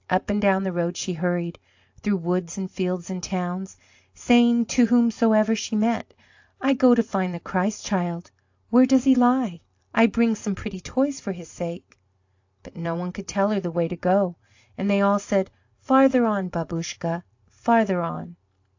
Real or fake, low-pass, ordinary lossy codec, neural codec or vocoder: real; 7.2 kHz; AAC, 48 kbps; none